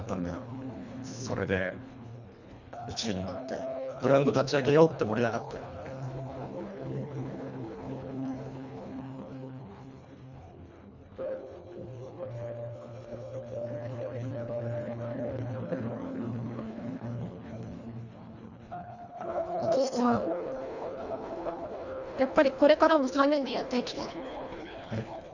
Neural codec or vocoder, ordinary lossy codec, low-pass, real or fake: codec, 24 kHz, 1.5 kbps, HILCodec; none; 7.2 kHz; fake